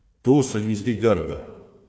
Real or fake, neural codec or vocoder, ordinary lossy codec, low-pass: fake; codec, 16 kHz, 1 kbps, FunCodec, trained on Chinese and English, 50 frames a second; none; none